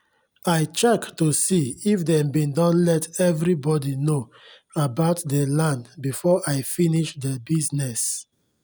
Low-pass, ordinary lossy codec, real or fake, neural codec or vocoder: none; none; real; none